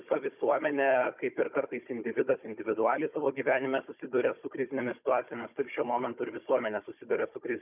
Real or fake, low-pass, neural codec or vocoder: fake; 3.6 kHz; codec, 16 kHz, 16 kbps, FunCodec, trained on Chinese and English, 50 frames a second